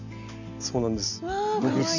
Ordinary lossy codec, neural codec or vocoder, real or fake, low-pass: none; none; real; 7.2 kHz